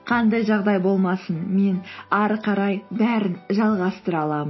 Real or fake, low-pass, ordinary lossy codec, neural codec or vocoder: real; 7.2 kHz; MP3, 24 kbps; none